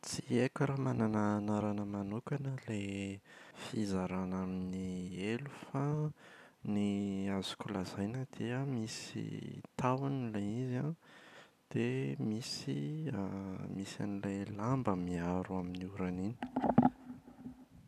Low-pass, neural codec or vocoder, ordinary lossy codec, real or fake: 14.4 kHz; none; none; real